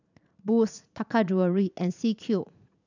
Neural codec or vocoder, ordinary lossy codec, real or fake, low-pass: vocoder, 44.1 kHz, 80 mel bands, Vocos; none; fake; 7.2 kHz